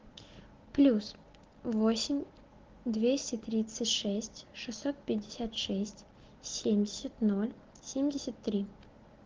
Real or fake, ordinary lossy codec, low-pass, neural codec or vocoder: real; Opus, 16 kbps; 7.2 kHz; none